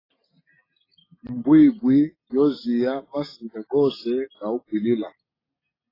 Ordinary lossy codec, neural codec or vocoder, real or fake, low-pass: AAC, 24 kbps; none; real; 5.4 kHz